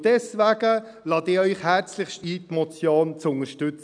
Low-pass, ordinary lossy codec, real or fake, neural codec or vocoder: 9.9 kHz; none; real; none